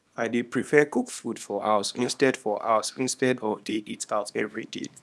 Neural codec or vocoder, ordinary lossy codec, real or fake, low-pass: codec, 24 kHz, 0.9 kbps, WavTokenizer, small release; none; fake; none